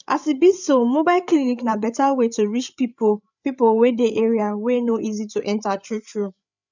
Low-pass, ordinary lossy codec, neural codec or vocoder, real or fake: 7.2 kHz; none; codec, 16 kHz, 8 kbps, FreqCodec, larger model; fake